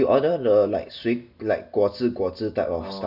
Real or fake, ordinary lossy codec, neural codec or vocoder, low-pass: real; none; none; 5.4 kHz